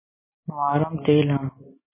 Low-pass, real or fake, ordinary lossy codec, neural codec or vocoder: 3.6 kHz; real; MP3, 16 kbps; none